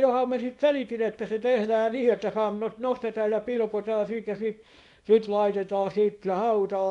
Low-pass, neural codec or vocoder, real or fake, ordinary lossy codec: 10.8 kHz; codec, 24 kHz, 0.9 kbps, WavTokenizer, small release; fake; none